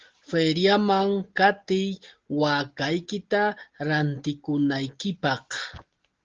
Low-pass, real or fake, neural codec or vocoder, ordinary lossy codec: 7.2 kHz; real; none; Opus, 16 kbps